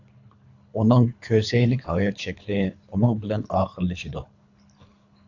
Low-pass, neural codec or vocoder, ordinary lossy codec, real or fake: 7.2 kHz; codec, 24 kHz, 3 kbps, HILCodec; AAC, 48 kbps; fake